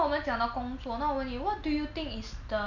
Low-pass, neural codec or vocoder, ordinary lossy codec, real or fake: 7.2 kHz; none; none; real